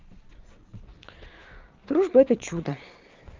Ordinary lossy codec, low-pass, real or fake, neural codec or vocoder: Opus, 24 kbps; 7.2 kHz; fake; vocoder, 22.05 kHz, 80 mel bands, Vocos